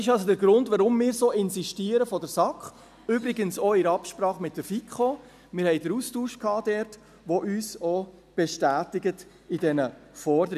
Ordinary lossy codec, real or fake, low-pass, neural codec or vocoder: none; real; 14.4 kHz; none